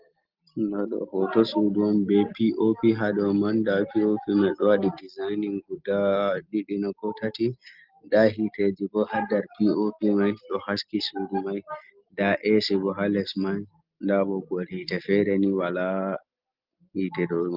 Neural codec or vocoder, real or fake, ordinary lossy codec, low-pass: none; real; Opus, 32 kbps; 5.4 kHz